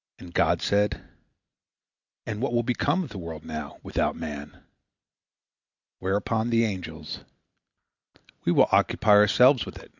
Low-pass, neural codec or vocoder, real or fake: 7.2 kHz; none; real